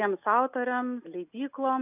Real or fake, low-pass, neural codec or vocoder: real; 3.6 kHz; none